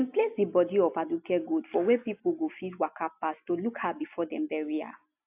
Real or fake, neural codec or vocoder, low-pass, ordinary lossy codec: real; none; 3.6 kHz; AAC, 32 kbps